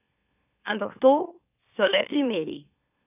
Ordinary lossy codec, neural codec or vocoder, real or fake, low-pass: none; autoencoder, 44.1 kHz, a latent of 192 numbers a frame, MeloTTS; fake; 3.6 kHz